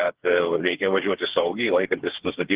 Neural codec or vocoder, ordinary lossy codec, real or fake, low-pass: codec, 16 kHz, 4 kbps, FreqCodec, smaller model; Opus, 16 kbps; fake; 3.6 kHz